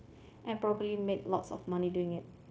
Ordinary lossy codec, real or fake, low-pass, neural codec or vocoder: none; fake; none; codec, 16 kHz, 0.9 kbps, LongCat-Audio-Codec